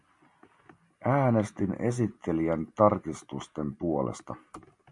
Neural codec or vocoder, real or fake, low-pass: none; real; 10.8 kHz